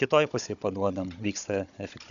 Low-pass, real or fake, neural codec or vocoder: 7.2 kHz; fake; codec, 16 kHz, 16 kbps, FunCodec, trained on Chinese and English, 50 frames a second